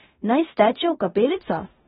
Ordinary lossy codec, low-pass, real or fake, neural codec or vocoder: AAC, 16 kbps; 10.8 kHz; fake; codec, 16 kHz in and 24 kHz out, 0.9 kbps, LongCat-Audio-Codec, four codebook decoder